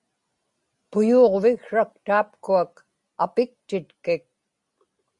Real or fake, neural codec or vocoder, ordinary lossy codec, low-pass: real; none; Opus, 64 kbps; 10.8 kHz